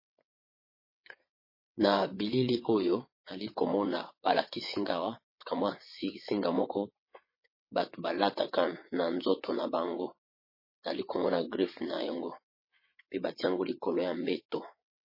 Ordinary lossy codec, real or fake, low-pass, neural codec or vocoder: MP3, 24 kbps; fake; 5.4 kHz; vocoder, 22.05 kHz, 80 mel bands, WaveNeXt